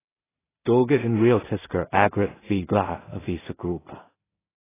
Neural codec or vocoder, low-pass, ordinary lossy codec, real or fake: codec, 16 kHz in and 24 kHz out, 0.4 kbps, LongCat-Audio-Codec, two codebook decoder; 3.6 kHz; AAC, 16 kbps; fake